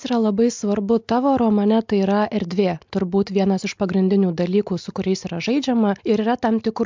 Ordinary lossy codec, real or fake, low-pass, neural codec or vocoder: MP3, 64 kbps; real; 7.2 kHz; none